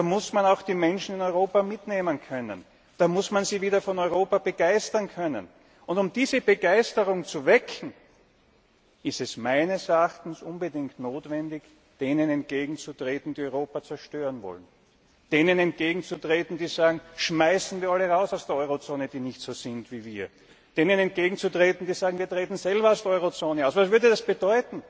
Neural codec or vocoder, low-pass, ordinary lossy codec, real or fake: none; none; none; real